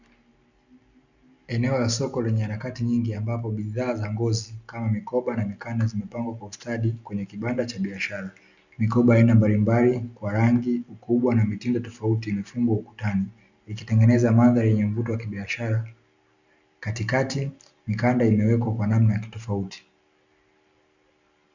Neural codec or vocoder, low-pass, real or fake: none; 7.2 kHz; real